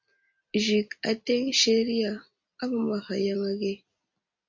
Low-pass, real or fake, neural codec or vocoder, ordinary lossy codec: 7.2 kHz; real; none; MP3, 48 kbps